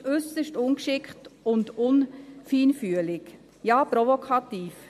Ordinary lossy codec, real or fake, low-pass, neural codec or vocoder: MP3, 64 kbps; real; 14.4 kHz; none